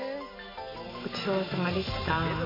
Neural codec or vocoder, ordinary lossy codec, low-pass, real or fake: none; MP3, 24 kbps; 5.4 kHz; real